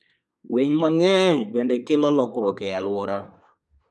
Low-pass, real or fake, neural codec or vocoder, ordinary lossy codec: none; fake; codec, 24 kHz, 1 kbps, SNAC; none